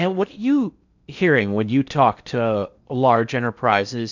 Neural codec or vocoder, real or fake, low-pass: codec, 16 kHz in and 24 kHz out, 0.6 kbps, FocalCodec, streaming, 4096 codes; fake; 7.2 kHz